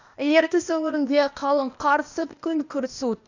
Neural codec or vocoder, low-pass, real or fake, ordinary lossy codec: codec, 16 kHz, 0.8 kbps, ZipCodec; 7.2 kHz; fake; none